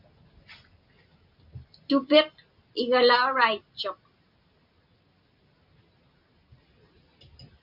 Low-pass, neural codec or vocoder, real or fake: 5.4 kHz; none; real